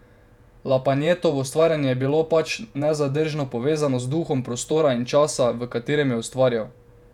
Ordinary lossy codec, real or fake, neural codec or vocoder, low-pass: none; fake; vocoder, 48 kHz, 128 mel bands, Vocos; 19.8 kHz